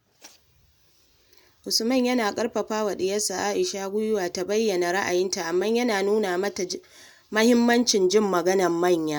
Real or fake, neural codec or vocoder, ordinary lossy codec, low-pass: real; none; none; none